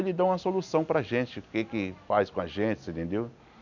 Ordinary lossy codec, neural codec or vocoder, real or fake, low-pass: none; none; real; 7.2 kHz